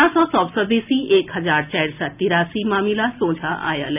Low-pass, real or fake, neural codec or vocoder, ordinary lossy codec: 3.6 kHz; real; none; none